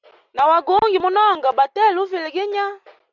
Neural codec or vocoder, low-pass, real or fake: none; 7.2 kHz; real